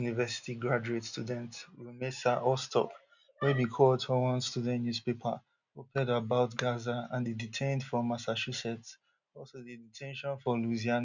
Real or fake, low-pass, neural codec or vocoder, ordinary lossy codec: real; 7.2 kHz; none; none